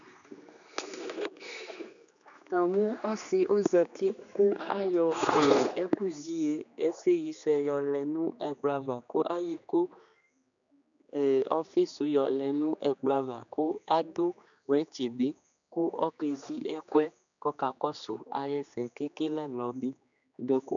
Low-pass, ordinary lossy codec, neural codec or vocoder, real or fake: 7.2 kHz; MP3, 96 kbps; codec, 16 kHz, 2 kbps, X-Codec, HuBERT features, trained on general audio; fake